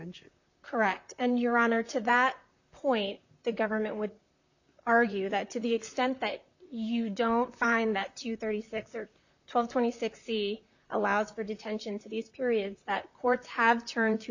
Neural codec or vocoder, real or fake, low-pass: vocoder, 44.1 kHz, 128 mel bands, Pupu-Vocoder; fake; 7.2 kHz